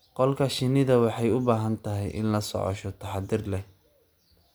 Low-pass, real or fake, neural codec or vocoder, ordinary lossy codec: none; real; none; none